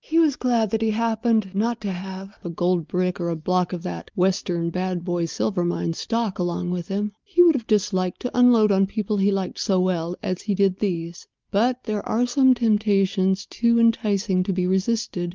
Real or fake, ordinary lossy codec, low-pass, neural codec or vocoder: real; Opus, 32 kbps; 7.2 kHz; none